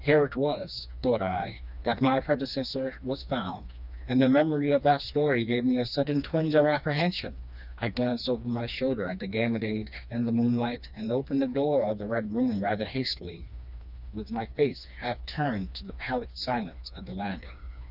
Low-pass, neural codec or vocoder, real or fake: 5.4 kHz; codec, 16 kHz, 2 kbps, FreqCodec, smaller model; fake